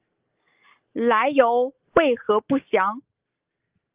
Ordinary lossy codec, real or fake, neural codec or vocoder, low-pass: Opus, 32 kbps; real; none; 3.6 kHz